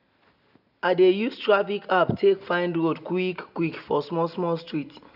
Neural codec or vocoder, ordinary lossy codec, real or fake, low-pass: none; none; real; 5.4 kHz